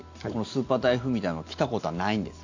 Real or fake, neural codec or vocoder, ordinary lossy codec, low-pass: real; none; AAC, 48 kbps; 7.2 kHz